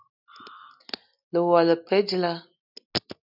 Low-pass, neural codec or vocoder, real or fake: 5.4 kHz; none; real